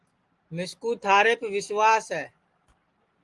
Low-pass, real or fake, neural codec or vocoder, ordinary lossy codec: 10.8 kHz; real; none; Opus, 24 kbps